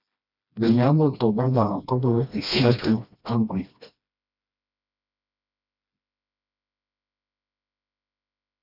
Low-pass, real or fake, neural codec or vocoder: 5.4 kHz; fake; codec, 16 kHz, 1 kbps, FreqCodec, smaller model